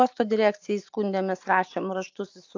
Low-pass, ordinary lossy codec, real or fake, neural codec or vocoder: 7.2 kHz; AAC, 48 kbps; real; none